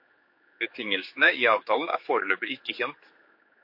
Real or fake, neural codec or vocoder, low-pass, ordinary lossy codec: fake; codec, 16 kHz, 4 kbps, X-Codec, HuBERT features, trained on general audio; 5.4 kHz; MP3, 32 kbps